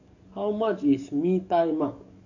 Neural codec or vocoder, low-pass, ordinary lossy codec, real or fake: codec, 44.1 kHz, 7.8 kbps, DAC; 7.2 kHz; none; fake